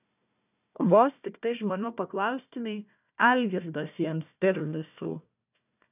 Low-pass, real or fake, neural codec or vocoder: 3.6 kHz; fake; codec, 16 kHz, 1 kbps, FunCodec, trained on Chinese and English, 50 frames a second